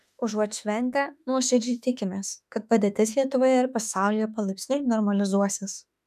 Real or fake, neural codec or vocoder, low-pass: fake; autoencoder, 48 kHz, 32 numbers a frame, DAC-VAE, trained on Japanese speech; 14.4 kHz